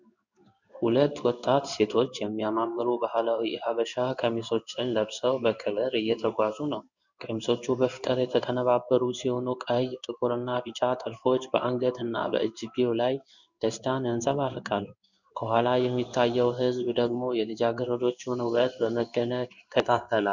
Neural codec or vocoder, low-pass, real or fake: codec, 16 kHz in and 24 kHz out, 1 kbps, XY-Tokenizer; 7.2 kHz; fake